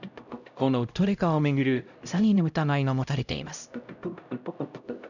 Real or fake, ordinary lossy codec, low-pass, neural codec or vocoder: fake; none; 7.2 kHz; codec, 16 kHz, 0.5 kbps, X-Codec, HuBERT features, trained on LibriSpeech